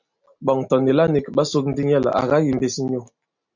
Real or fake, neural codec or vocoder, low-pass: real; none; 7.2 kHz